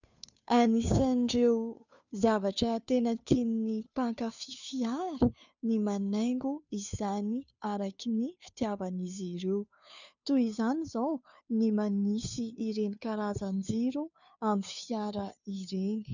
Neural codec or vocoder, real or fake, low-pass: codec, 16 kHz, 2 kbps, FunCodec, trained on Chinese and English, 25 frames a second; fake; 7.2 kHz